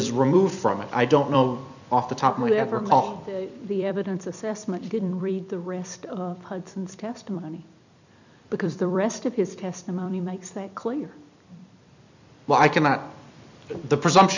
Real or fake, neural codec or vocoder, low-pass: fake; vocoder, 44.1 kHz, 128 mel bands every 256 samples, BigVGAN v2; 7.2 kHz